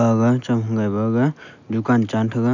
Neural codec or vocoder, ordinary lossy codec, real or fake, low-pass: none; none; real; 7.2 kHz